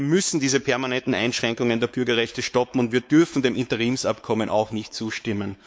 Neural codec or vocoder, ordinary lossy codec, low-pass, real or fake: codec, 16 kHz, 4 kbps, X-Codec, WavLM features, trained on Multilingual LibriSpeech; none; none; fake